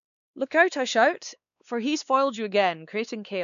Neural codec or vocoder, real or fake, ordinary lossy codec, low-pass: codec, 16 kHz, 2 kbps, X-Codec, WavLM features, trained on Multilingual LibriSpeech; fake; none; 7.2 kHz